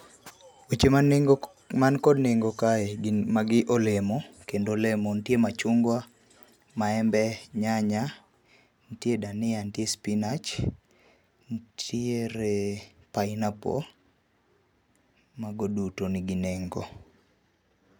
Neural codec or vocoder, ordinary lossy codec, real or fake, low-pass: none; none; real; none